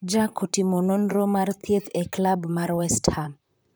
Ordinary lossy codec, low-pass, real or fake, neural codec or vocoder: none; none; fake; vocoder, 44.1 kHz, 128 mel bands, Pupu-Vocoder